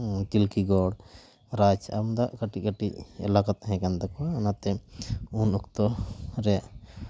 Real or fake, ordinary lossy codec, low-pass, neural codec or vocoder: real; none; none; none